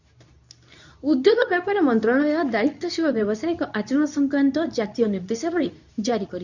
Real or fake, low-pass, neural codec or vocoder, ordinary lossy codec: fake; 7.2 kHz; codec, 24 kHz, 0.9 kbps, WavTokenizer, medium speech release version 2; none